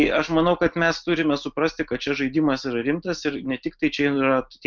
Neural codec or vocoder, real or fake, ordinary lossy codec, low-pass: none; real; Opus, 24 kbps; 7.2 kHz